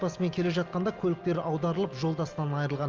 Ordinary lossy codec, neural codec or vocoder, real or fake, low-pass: Opus, 32 kbps; none; real; 7.2 kHz